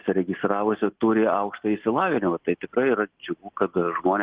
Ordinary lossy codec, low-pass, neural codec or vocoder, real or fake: Opus, 16 kbps; 3.6 kHz; none; real